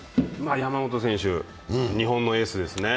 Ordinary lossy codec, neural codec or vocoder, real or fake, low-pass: none; none; real; none